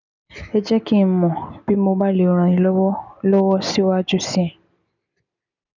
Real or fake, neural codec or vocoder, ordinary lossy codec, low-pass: real; none; none; 7.2 kHz